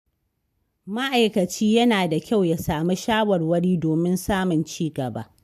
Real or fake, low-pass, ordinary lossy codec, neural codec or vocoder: real; 14.4 kHz; MP3, 96 kbps; none